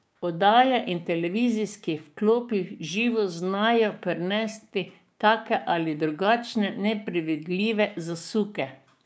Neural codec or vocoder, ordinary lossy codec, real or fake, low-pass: codec, 16 kHz, 6 kbps, DAC; none; fake; none